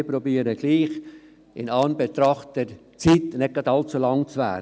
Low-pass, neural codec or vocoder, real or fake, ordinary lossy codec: none; none; real; none